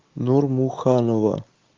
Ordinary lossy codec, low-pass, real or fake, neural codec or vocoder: Opus, 24 kbps; 7.2 kHz; real; none